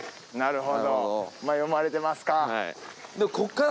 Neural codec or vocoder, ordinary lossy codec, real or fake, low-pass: none; none; real; none